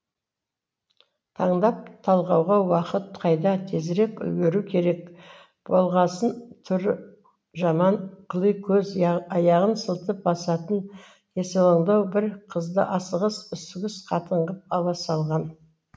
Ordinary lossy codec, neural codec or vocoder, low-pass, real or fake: none; none; none; real